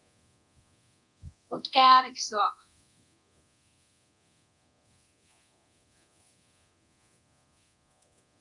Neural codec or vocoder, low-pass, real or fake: codec, 24 kHz, 0.9 kbps, DualCodec; 10.8 kHz; fake